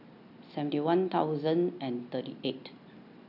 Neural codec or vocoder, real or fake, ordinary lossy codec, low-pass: none; real; none; 5.4 kHz